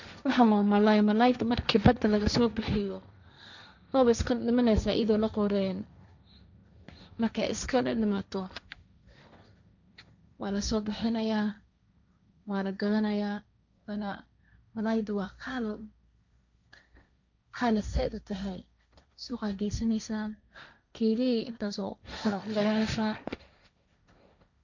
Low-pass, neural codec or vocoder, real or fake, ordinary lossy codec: 7.2 kHz; codec, 16 kHz, 1.1 kbps, Voila-Tokenizer; fake; none